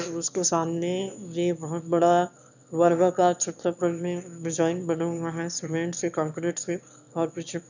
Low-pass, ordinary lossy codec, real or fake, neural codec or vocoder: 7.2 kHz; none; fake; autoencoder, 22.05 kHz, a latent of 192 numbers a frame, VITS, trained on one speaker